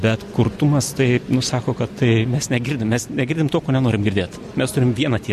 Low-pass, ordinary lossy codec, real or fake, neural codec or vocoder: 14.4 kHz; MP3, 64 kbps; real; none